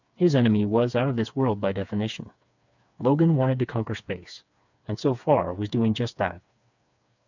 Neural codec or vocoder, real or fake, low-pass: codec, 16 kHz, 4 kbps, FreqCodec, smaller model; fake; 7.2 kHz